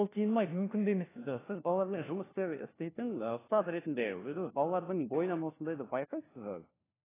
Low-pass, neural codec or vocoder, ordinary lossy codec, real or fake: 3.6 kHz; codec, 16 kHz, 1 kbps, FunCodec, trained on LibriTTS, 50 frames a second; AAC, 16 kbps; fake